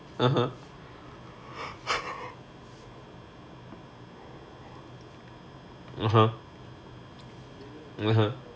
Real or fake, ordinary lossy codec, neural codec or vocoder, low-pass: real; none; none; none